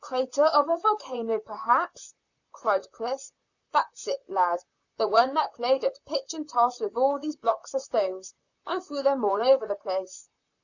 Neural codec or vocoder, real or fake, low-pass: vocoder, 44.1 kHz, 128 mel bands, Pupu-Vocoder; fake; 7.2 kHz